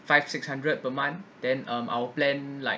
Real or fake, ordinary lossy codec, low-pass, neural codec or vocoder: real; none; none; none